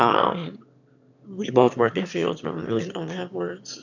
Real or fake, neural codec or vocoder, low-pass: fake; autoencoder, 22.05 kHz, a latent of 192 numbers a frame, VITS, trained on one speaker; 7.2 kHz